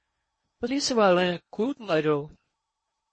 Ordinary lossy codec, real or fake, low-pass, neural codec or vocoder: MP3, 32 kbps; fake; 9.9 kHz; codec, 16 kHz in and 24 kHz out, 0.8 kbps, FocalCodec, streaming, 65536 codes